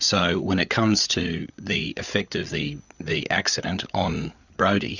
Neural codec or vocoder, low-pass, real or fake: codec, 16 kHz, 8 kbps, FreqCodec, larger model; 7.2 kHz; fake